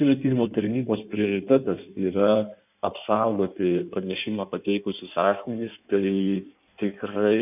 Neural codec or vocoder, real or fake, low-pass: codec, 16 kHz in and 24 kHz out, 1.1 kbps, FireRedTTS-2 codec; fake; 3.6 kHz